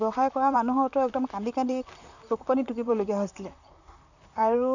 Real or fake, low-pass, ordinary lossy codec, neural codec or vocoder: fake; 7.2 kHz; none; vocoder, 44.1 kHz, 128 mel bands, Pupu-Vocoder